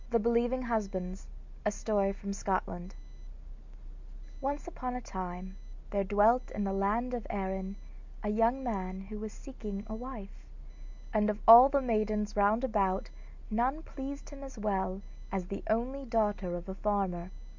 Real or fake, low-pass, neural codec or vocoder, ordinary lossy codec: real; 7.2 kHz; none; MP3, 64 kbps